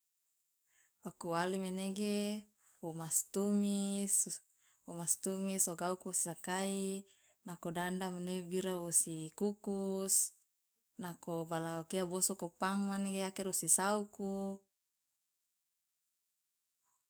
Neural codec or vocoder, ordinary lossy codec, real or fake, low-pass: codec, 44.1 kHz, 7.8 kbps, DAC; none; fake; none